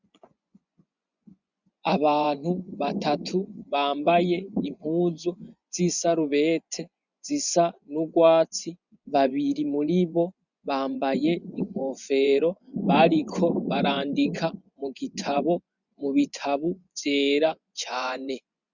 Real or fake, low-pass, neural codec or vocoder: fake; 7.2 kHz; vocoder, 24 kHz, 100 mel bands, Vocos